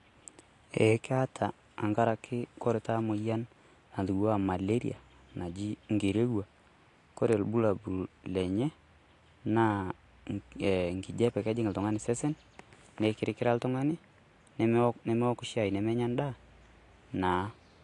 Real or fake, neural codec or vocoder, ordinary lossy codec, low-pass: real; none; AAC, 48 kbps; 10.8 kHz